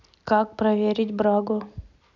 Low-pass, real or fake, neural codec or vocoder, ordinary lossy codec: 7.2 kHz; real; none; none